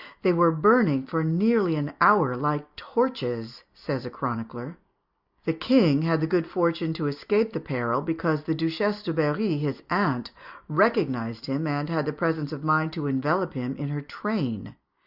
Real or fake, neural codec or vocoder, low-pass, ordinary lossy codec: real; none; 5.4 kHz; Opus, 64 kbps